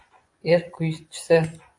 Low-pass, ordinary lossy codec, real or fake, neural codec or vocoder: 10.8 kHz; AAC, 64 kbps; fake; vocoder, 24 kHz, 100 mel bands, Vocos